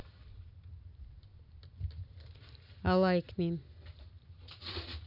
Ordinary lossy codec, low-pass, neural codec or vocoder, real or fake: none; 5.4 kHz; none; real